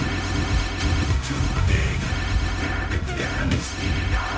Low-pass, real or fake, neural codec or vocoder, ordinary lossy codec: none; fake; codec, 16 kHz, 0.4 kbps, LongCat-Audio-Codec; none